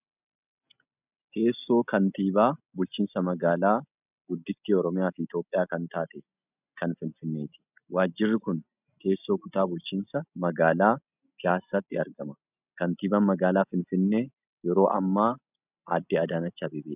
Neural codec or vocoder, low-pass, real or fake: none; 3.6 kHz; real